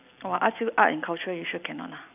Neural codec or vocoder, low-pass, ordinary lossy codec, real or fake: none; 3.6 kHz; none; real